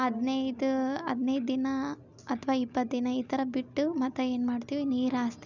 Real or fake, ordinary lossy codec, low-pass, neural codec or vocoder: real; none; 7.2 kHz; none